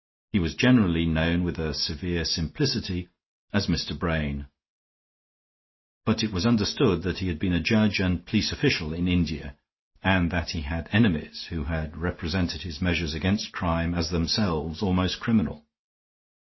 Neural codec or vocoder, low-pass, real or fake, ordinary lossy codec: none; 7.2 kHz; real; MP3, 24 kbps